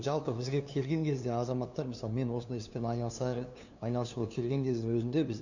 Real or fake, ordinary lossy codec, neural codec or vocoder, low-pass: fake; none; codec, 16 kHz, 2 kbps, FunCodec, trained on LibriTTS, 25 frames a second; 7.2 kHz